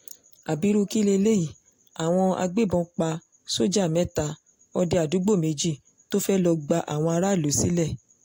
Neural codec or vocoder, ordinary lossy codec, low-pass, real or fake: none; AAC, 48 kbps; 19.8 kHz; real